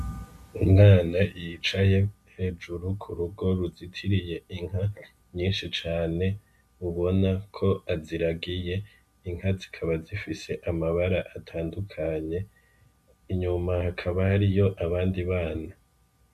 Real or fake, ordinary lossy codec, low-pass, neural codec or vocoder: real; MP3, 96 kbps; 14.4 kHz; none